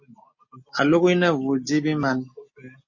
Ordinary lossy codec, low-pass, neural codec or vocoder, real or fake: MP3, 32 kbps; 7.2 kHz; none; real